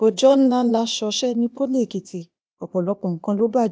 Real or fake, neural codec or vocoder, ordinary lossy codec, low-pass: fake; codec, 16 kHz, 0.8 kbps, ZipCodec; none; none